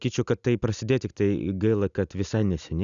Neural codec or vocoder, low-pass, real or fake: none; 7.2 kHz; real